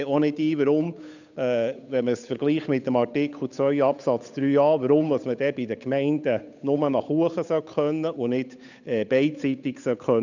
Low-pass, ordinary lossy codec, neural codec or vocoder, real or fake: 7.2 kHz; none; codec, 16 kHz, 8 kbps, FunCodec, trained on Chinese and English, 25 frames a second; fake